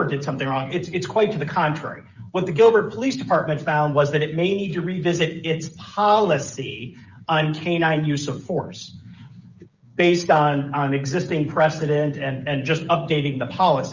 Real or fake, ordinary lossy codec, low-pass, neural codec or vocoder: fake; Opus, 64 kbps; 7.2 kHz; codec, 16 kHz, 16 kbps, FreqCodec, smaller model